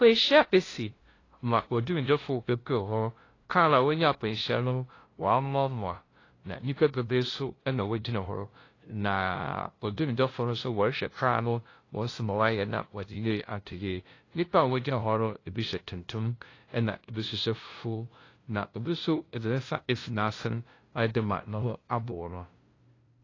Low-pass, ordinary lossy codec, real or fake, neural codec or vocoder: 7.2 kHz; AAC, 32 kbps; fake; codec, 16 kHz, 0.5 kbps, FunCodec, trained on LibriTTS, 25 frames a second